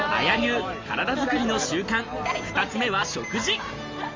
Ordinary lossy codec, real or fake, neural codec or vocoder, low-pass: Opus, 32 kbps; real; none; 7.2 kHz